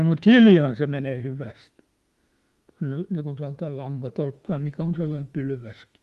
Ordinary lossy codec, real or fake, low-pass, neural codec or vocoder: Opus, 24 kbps; fake; 14.4 kHz; autoencoder, 48 kHz, 32 numbers a frame, DAC-VAE, trained on Japanese speech